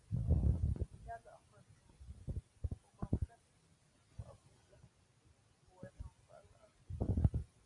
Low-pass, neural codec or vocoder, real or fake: 10.8 kHz; none; real